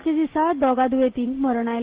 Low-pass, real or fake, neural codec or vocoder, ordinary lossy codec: 3.6 kHz; real; none; Opus, 16 kbps